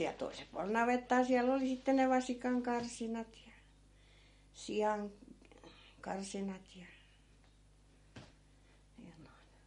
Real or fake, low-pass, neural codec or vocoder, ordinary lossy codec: real; 19.8 kHz; none; MP3, 48 kbps